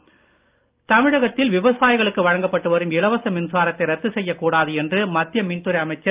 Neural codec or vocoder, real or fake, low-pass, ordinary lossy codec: none; real; 3.6 kHz; Opus, 24 kbps